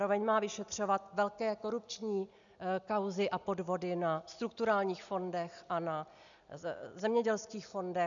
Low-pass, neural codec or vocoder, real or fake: 7.2 kHz; none; real